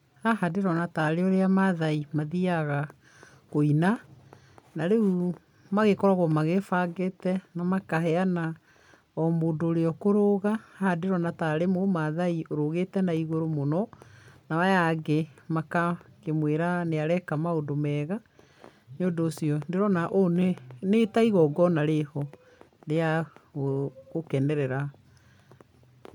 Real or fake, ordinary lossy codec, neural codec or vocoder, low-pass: real; MP3, 96 kbps; none; 19.8 kHz